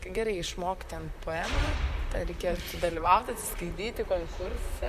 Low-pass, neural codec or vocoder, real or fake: 14.4 kHz; vocoder, 44.1 kHz, 128 mel bands, Pupu-Vocoder; fake